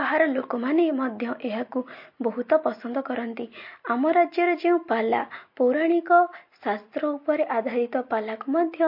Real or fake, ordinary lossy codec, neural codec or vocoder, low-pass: real; MP3, 32 kbps; none; 5.4 kHz